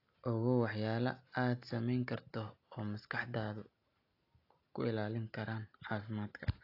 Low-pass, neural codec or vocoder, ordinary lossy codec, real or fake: 5.4 kHz; none; AAC, 32 kbps; real